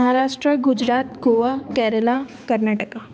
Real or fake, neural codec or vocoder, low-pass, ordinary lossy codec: fake; codec, 16 kHz, 4 kbps, X-Codec, HuBERT features, trained on balanced general audio; none; none